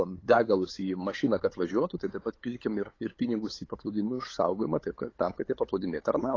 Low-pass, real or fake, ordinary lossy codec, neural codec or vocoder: 7.2 kHz; fake; AAC, 32 kbps; codec, 16 kHz, 8 kbps, FunCodec, trained on LibriTTS, 25 frames a second